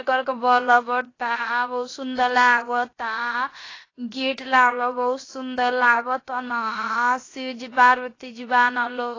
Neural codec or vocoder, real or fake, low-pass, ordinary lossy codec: codec, 16 kHz, 0.7 kbps, FocalCodec; fake; 7.2 kHz; AAC, 32 kbps